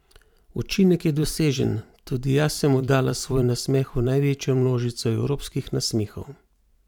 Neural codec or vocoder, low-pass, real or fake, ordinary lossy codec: vocoder, 44.1 kHz, 128 mel bands every 512 samples, BigVGAN v2; 19.8 kHz; fake; none